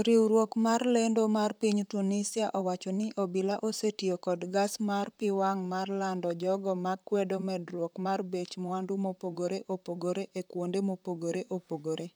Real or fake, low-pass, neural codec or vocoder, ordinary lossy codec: fake; none; vocoder, 44.1 kHz, 128 mel bands, Pupu-Vocoder; none